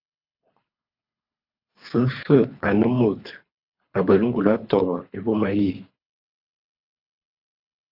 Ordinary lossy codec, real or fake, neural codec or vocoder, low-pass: AAC, 48 kbps; fake; codec, 24 kHz, 3 kbps, HILCodec; 5.4 kHz